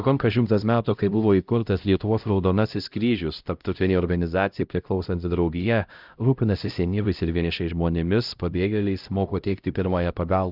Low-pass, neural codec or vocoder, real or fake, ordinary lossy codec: 5.4 kHz; codec, 16 kHz, 0.5 kbps, X-Codec, HuBERT features, trained on LibriSpeech; fake; Opus, 24 kbps